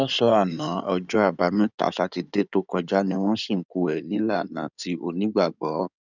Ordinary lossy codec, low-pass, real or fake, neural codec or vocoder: none; 7.2 kHz; fake; codec, 16 kHz in and 24 kHz out, 2.2 kbps, FireRedTTS-2 codec